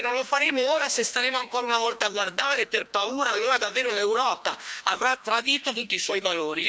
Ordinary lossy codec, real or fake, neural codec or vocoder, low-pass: none; fake; codec, 16 kHz, 1 kbps, FreqCodec, larger model; none